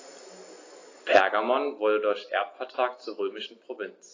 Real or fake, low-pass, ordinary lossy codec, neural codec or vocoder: real; 7.2 kHz; AAC, 32 kbps; none